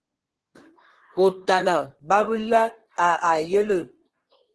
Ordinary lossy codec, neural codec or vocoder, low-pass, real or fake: Opus, 16 kbps; codec, 24 kHz, 1 kbps, SNAC; 10.8 kHz; fake